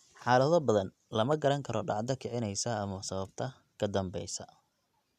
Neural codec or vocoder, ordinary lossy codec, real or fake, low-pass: none; none; real; 14.4 kHz